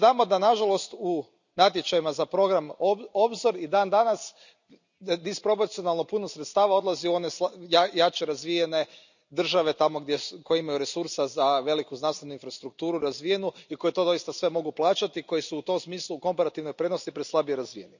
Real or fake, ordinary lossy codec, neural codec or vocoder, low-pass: real; none; none; 7.2 kHz